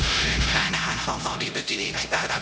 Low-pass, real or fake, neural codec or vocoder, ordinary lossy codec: none; fake; codec, 16 kHz, 0.5 kbps, X-Codec, HuBERT features, trained on LibriSpeech; none